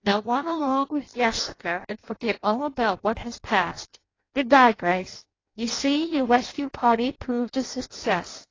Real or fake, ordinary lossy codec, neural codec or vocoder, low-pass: fake; AAC, 32 kbps; codec, 16 kHz in and 24 kHz out, 0.6 kbps, FireRedTTS-2 codec; 7.2 kHz